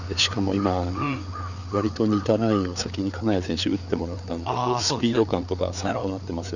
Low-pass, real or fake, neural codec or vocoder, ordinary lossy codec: 7.2 kHz; fake; codec, 16 kHz, 4 kbps, FreqCodec, larger model; none